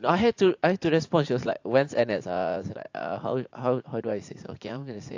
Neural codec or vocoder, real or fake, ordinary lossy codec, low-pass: vocoder, 44.1 kHz, 80 mel bands, Vocos; fake; AAC, 48 kbps; 7.2 kHz